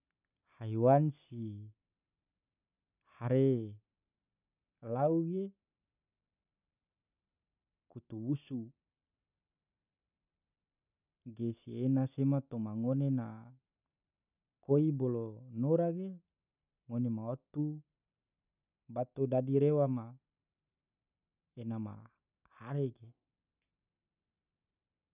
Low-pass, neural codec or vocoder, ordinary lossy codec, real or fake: 3.6 kHz; none; none; real